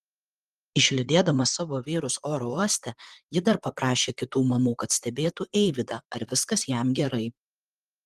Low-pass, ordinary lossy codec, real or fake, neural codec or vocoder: 14.4 kHz; Opus, 24 kbps; fake; vocoder, 44.1 kHz, 128 mel bands, Pupu-Vocoder